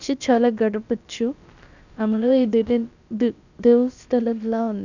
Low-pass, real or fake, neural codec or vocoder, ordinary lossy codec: 7.2 kHz; fake; codec, 16 kHz, about 1 kbps, DyCAST, with the encoder's durations; none